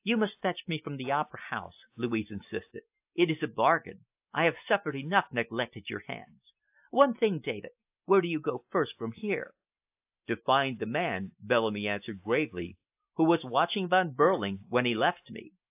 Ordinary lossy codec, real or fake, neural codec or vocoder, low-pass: AAC, 32 kbps; real; none; 3.6 kHz